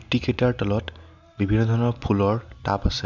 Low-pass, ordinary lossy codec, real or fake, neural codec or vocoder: 7.2 kHz; none; real; none